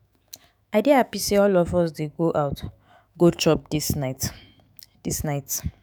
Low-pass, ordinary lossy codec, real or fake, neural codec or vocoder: none; none; fake; autoencoder, 48 kHz, 128 numbers a frame, DAC-VAE, trained on Japanese speech